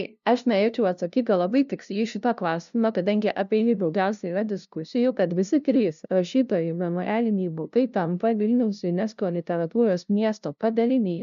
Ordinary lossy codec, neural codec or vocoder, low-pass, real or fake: MP3, 96 kbps; codec, 16 kHz, 0.5 kbps, FunCodec, trained on LibriTTS, 25 frames a second; 7.2 kHz; fake